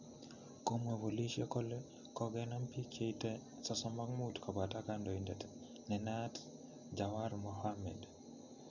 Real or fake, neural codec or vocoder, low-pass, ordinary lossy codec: real; none; 7.2 kHz; none